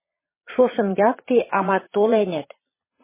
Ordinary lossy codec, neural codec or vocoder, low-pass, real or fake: MP3, 16 kbps; vocoder, 22.05 kHz, 80 mel bands, Vocos; 3.6 kHz; fake